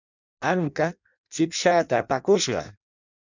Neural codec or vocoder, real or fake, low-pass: codec, 16 kHz in and 24 kHz out, 0.6 kbps, FireRedTTS-2 codec; fake; 7.2 kHz